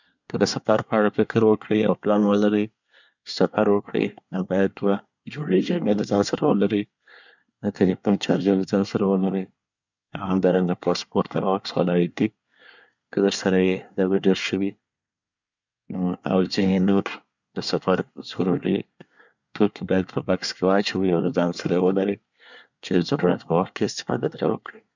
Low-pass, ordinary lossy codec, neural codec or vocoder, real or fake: 7.2 kHz; none; codec, 24 kHz, 1 kbps, SNAC; fake